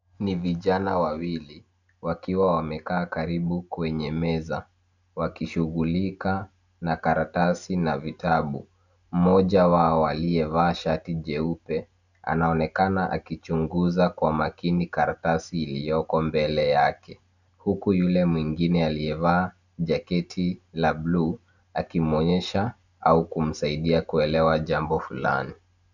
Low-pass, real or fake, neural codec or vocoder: 7.2 kHz; real; none